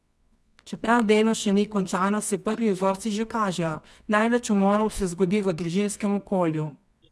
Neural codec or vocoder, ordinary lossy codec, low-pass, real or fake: codec, 24 kHz, 0.9 kbps, WavTokenizer, medium music audio release; none; none; fake